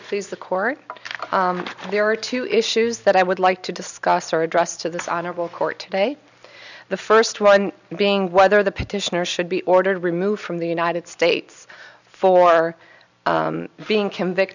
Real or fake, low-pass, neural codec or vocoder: real; 7.2 kHz; none